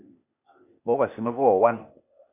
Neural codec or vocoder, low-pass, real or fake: codec, 16 kHz, 0.8 kbps, ZipCodec; 3.6 kHz; fake